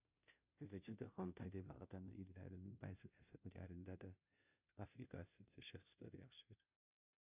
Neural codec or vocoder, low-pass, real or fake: codec, 16 kHz, 0.5 kbps, FunCodec, trained on Chinese and English, 25 frames a second; 3.6 kHz; fake